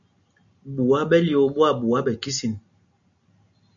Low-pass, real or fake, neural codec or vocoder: 7.2 kHz; real; none